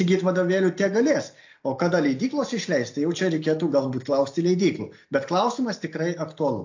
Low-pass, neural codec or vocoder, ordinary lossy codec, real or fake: 7.2 kHz; none; AAC, 48 kbps; real